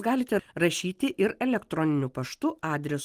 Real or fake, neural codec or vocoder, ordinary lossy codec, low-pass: real; none; Opus, 24 kbps; 14.4 kHz